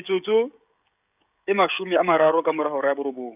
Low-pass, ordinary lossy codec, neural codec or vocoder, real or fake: 3.6 kHz; none; codec, 16 kHz, 16 kbps, FreqCodec, smaller model; fake